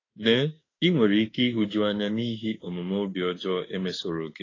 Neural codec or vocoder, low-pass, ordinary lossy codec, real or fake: autoencoder, 48 kHz, 32 numbers a frame, DAC-VAE, trained on Japanese speech; 7.2 kHz; AAC, 32 kbps; fake